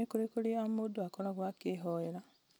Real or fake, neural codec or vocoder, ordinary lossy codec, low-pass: real; none; none; none